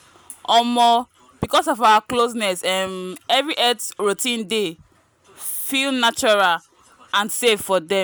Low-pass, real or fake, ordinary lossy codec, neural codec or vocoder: none; real; none; none